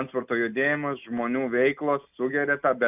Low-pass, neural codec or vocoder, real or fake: 3.6 kHz; none; real